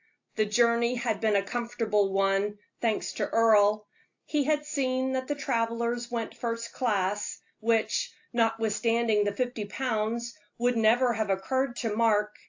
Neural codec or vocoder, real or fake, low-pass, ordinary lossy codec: none; real; 7.2 kHz; AAC, 48 kbps